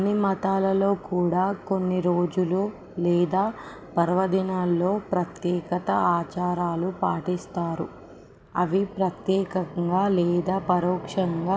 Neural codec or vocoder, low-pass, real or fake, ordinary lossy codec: none; none; real; none